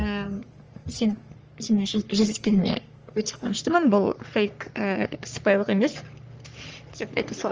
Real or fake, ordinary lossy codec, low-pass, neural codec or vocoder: fake; Opus, 24 kbps; 7.2 kHz; codec, 44.1 kHz, 3.4 kbps, Pupu-Codec